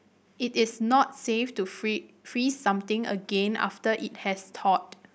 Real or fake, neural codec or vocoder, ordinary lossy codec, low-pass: real; none; none; none